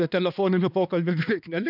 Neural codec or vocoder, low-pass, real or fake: codec, 16 kHz, 2 kbps, X-Codec, HuBERT features, trained on balanced general audio; 5.4 kHz; fake